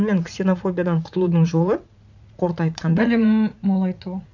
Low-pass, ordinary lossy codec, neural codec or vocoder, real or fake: 7.2 kHz; none; vocoder, 44.1 kHz, 80 mel bands, Vocos; fake